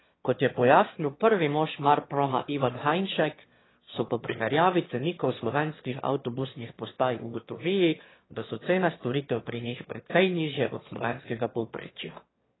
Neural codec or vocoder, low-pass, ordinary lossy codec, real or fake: autoencoder, 22.05 kHz, a latent of 192 numbers a frame, VITS, trained on one speaker; 7.2 kHz; AAC, 16 kbps; fake